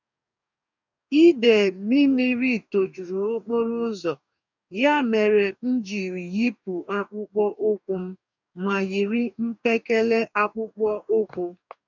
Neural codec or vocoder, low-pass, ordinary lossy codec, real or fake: codec, 44.1 kHz, 2.6 kbps, DAC; 7.2 kHz; none; fake